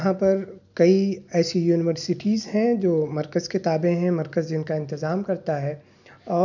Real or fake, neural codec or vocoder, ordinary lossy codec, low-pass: real; none; none; 7.2 kHz